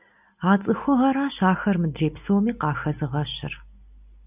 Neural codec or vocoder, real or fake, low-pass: none; real; 3.6 kHz